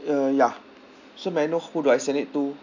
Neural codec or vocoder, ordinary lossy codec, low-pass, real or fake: none; none; 7.2 kHz; real